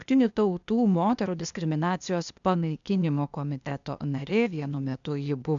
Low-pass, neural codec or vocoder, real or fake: 7.2 kHz; codec, 16 kHz, 0.8 kbps, ZipCodec; fake